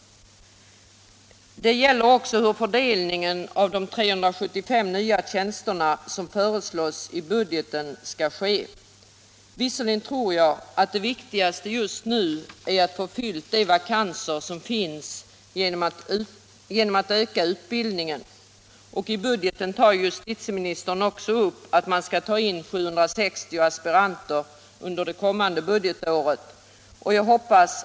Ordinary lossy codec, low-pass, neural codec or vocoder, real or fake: none; none; none; real